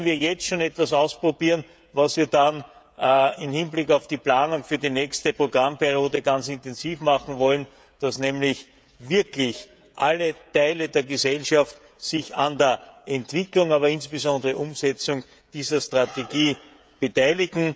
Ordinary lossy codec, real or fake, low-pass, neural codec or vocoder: none; fake; none; codec, 16 kHz, 16 kbps, FreqCodec, smaller model